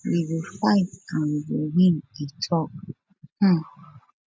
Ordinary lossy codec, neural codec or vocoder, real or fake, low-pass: none; none; real; none